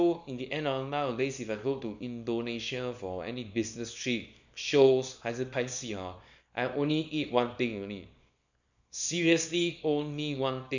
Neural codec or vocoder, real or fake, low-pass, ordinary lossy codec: codec, 24 kHz, 0.9 kbps, WavTokenizer, small release; fake; 7.2 kHz; none